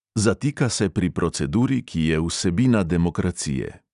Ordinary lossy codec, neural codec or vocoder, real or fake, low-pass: none; none; real; 10.8 kHz